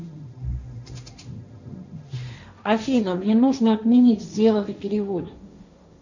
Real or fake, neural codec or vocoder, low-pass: fake; codec, 16 kHz, 1.1 kbps, Voila-Tokenizer; 7.2 kHz